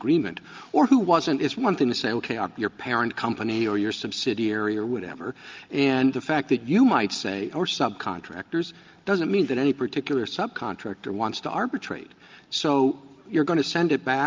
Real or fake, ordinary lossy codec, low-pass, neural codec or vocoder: real; Opus, 24 kbps; 7.2 kHz; none